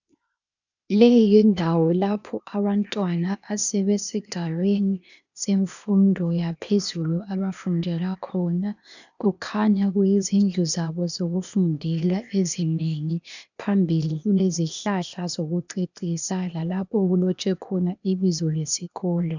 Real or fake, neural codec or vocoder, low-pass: fake; codec, 16 kHz, 0.8 kbps, ZipCodec; 7.2 kHz